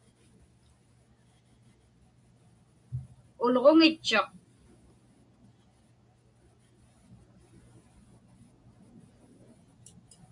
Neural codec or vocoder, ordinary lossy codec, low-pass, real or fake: none; MP3, 64 kbps; 10.8 kHz; real